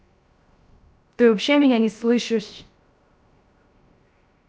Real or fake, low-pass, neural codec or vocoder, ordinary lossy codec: fake; none; codec, 16 kHz, 0.3 kbps, FocalCodec; none